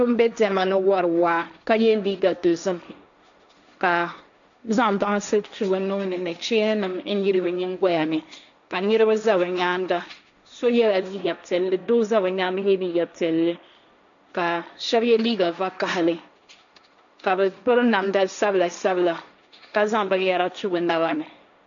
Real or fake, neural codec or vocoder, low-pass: fake; codec, 16 kHz, 1.1 kbps, Voila-Tokenizer; 7.2 kHz